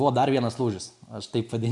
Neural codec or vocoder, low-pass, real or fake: none; 10.8 kHz; real